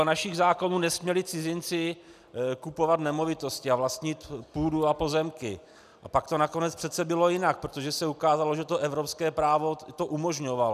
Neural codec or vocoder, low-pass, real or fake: none; 14.4 kHz; real